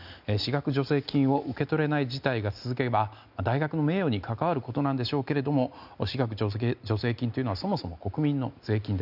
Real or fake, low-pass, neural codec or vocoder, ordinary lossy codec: real; 5.4 kHz; none; none